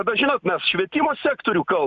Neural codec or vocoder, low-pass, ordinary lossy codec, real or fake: none; 7.2 kHz; Opus, 64 kbps; real